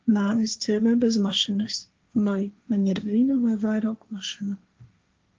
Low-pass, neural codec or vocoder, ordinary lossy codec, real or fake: 7.2 kHz; codec, 16 kHz, 1.1 kbps, Voila-Tokenizer; Opus, 24 kbps; fake